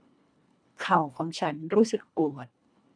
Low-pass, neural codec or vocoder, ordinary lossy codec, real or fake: 9.9 kHz; codec, 24 kHz, 1.5 kbps, HILCodec; none; fake